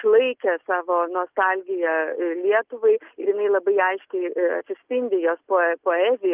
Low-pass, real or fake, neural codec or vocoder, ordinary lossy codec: 3.6 kHz; real; none; Opus, 24 kbps